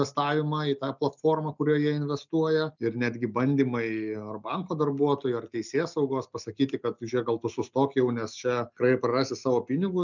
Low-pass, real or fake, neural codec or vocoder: 7.2 kHz; real; none